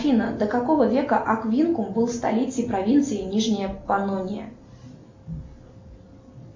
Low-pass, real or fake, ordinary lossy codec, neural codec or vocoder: 7.2 kHz; real; MP3, 48 kbps; none